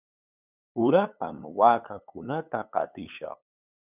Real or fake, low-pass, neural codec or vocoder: fake; 3.6 kHz; codec, 16 kHz, 8 kbps, FunCodec, trained on LibriTTS, 25 frames a second